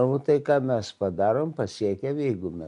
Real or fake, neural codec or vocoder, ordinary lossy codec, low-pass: real; none; MP3, 64 kbps; 10.8 kHz